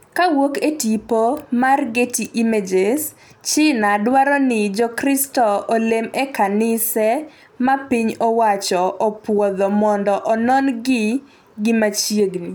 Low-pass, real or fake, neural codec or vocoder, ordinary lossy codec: none; real; none; none